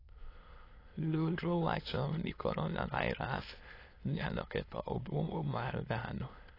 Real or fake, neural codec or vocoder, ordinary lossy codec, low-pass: fake; autoencoder, 22.05 kHz, a latent of 192 numbers a frame, VITS, trained on many speakers; AAC, 24 kbps; 5.4 kHz